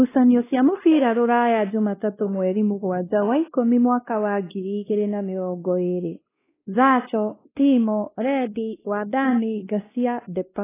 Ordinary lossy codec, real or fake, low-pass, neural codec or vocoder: AAC, 16 kbps; fake; 3.6 kHz; codec, 16 kHz, 1 kbps, X-Codec, WavLM features, trained on Multilingual LibriSpeech